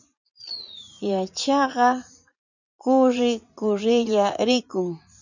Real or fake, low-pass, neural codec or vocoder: fake; 7.2 kHz; vocoder, 44.1 kHz, 80 mel bands, Vocos